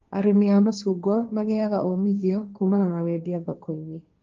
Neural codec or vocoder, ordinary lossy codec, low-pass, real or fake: codec, 16 kHz, 1.1 kbps, Voila-Tokenizer; Opus, 24 kbps; 7.2 kHz; fake